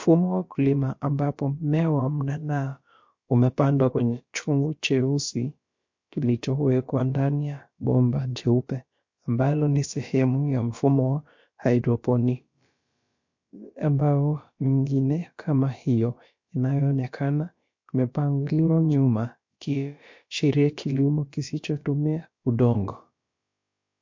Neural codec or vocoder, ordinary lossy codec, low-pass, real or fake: codec, 16 kHz, about 1 kbps, DyCAST, with the encoder's durations; MP3, 48 kbps; 7.2 kHz; fake